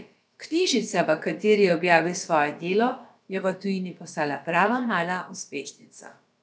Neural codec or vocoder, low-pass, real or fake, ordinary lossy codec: codec, 16 kHz, about 1 kbps, DyCAST, with the encoder's durations; none; fake; none